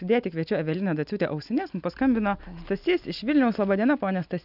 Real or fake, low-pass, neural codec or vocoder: real; 5.4 kHz; none